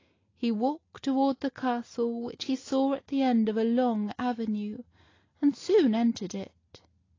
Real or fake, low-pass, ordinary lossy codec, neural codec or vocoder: real; 7.2 kHz; AAC, 32 kbps; none